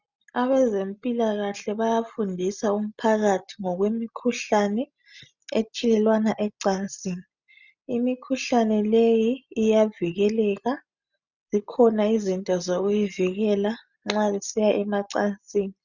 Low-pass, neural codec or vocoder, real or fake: 7.2 kHz; none; real